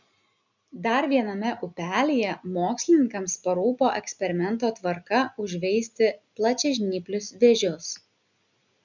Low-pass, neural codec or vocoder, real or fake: 7.2 kHz; none; real